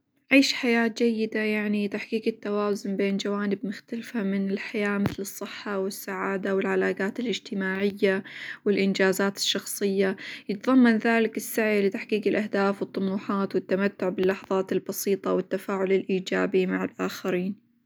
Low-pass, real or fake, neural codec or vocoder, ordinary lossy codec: none; real; none; none